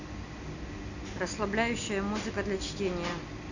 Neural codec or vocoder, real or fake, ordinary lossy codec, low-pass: none; real; none; 7.2 kHz